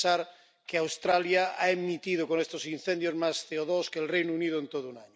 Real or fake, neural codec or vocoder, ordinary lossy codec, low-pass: real; none; none; none